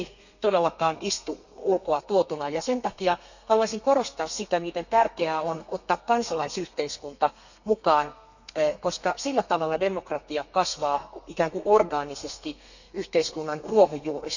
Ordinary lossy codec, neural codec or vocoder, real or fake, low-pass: none; codec, 32 kHz, 1.9 kbps, SNAC; fake; 7.2 kHz